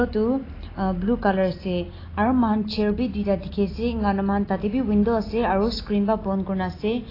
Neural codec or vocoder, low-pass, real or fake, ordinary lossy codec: none; 5.4 kHz; real; AAC, 24 kbps